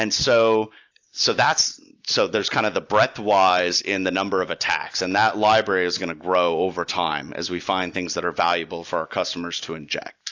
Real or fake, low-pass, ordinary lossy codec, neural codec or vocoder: real; 7.2 kHz; AAC, 48 kbps; none